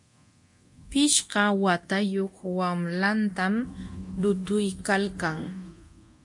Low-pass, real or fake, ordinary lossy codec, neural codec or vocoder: 10.8 kHz; fake; MP3, 48 kbps; codec, 24 kHz, 0.9 kbps, DualCodec